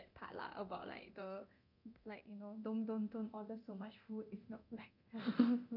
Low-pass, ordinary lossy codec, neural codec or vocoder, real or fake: 5.4 kHz; none; codec, 24 kHz, 0.9 kbps, DualCodec; fake